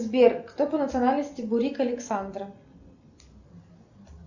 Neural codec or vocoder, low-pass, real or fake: none; 7.2 kHz; real